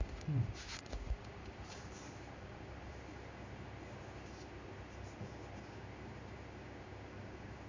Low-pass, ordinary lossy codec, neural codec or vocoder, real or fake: 7.2 kHz; MP3, 64 kbps; codec, 16 kHz in and 24 kHz out, 1 kbps, XY-Tokenizer; fake